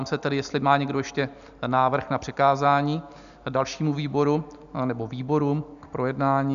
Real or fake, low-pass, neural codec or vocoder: real; 7.2 kHz; none